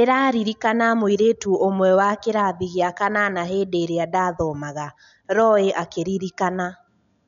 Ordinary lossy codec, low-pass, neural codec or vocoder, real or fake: none; 7.2 kHz; none; real